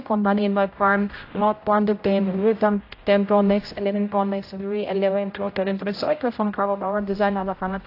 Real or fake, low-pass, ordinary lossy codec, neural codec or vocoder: fake; 5.4 kHz; AAC, 32 kbps; codec, 16 kHz, 0.5 kbps, X-Codec, HuBERT features, trained on general audio